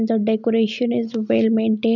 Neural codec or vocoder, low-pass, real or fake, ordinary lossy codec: none; 7.2 kHz; real; none